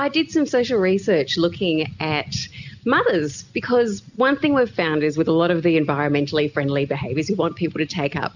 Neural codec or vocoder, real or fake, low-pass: none; real; 7.2 kHz